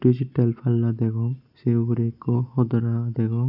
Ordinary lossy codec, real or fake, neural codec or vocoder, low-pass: none; real; none; 5.4 kHz